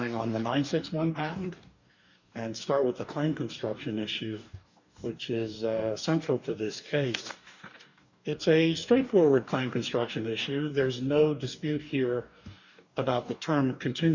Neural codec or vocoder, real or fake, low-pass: codec, 44.1 kHz, 2.6 kbps, DAC; fake; 7.2 kHz